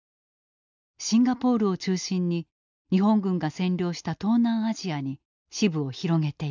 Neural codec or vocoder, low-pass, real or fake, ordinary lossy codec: none; 7.2 kHz; real; none